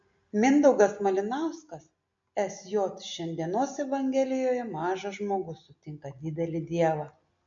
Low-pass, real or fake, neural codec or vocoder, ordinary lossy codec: 7.2 kHz; real; none; MP3, 48 kbps